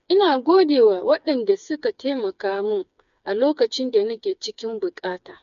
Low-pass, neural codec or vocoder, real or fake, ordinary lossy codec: 7.2 kHz; codec, 16 kHz, 4 kbps, FreqCodec, smaller model; fake; none